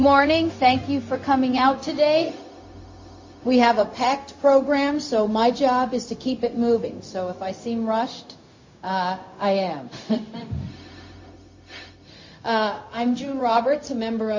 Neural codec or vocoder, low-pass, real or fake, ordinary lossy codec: codec, 16 kHz, 0.4 kbps, LongCat-Audio-Codec; 7.2 kHz; fake; MP3, 32 kbps